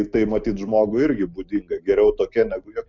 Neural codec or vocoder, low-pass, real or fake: none; 7.2 kHz; real